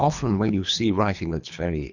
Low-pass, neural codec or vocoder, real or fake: 7.2 kHz; codec, 24 kHz, 3 kbps, HILCodec; fake